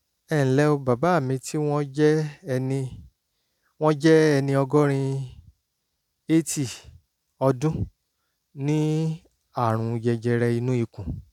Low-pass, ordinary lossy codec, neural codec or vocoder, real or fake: 19.8 kHz; none; none; real